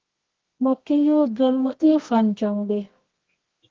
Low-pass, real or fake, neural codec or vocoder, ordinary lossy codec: 7.2 kHz; fake; codec, 24 kHz, 0.9 kbps, WavTokenizer, medium music audio release; Opus, 16 kbps